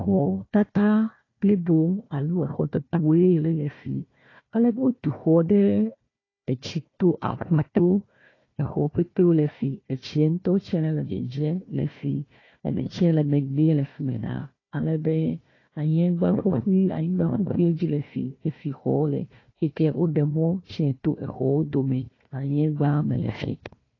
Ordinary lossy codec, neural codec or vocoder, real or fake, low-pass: AAC, 32 kbps; codec, 16 kHz, 1 kbps, FunCodec, trained on Chinese and English, 50 frames a second; fake; 7.2 kHz